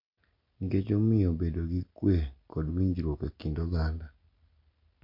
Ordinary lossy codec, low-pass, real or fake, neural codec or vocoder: MP3, 48 kbps; 5.4 kHz; real; none